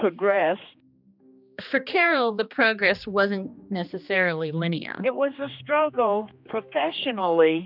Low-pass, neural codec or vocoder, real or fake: 5.4 kHz; codec, 16 kHz, 2 kbps, X-Codec, HuBERT features, trained on general audio; fake